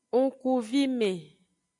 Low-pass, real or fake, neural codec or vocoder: 10.8 kHz; real; none